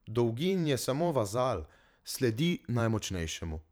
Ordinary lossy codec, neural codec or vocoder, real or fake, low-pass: none; vocoder, 44.1 kHz, 128 mel bands every 512 samples, BigVGAN v2; fake; none